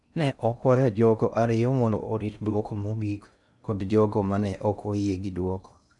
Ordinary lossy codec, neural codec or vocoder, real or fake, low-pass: none; codec, 16 kHz in and 24 kHz out, 0.6 kbps, FocalCodec, streaming, 2048 codes; fake; 10.8 kHz